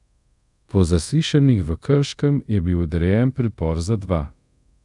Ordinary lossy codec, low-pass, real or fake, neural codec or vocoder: none; 10.8 kHz; fake; codec, 24 kHz, 0.5 kbps, DualCodec